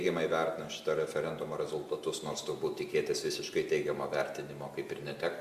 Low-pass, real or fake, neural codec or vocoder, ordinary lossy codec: 14.4 kHz; real; none; Opus, 64 kbps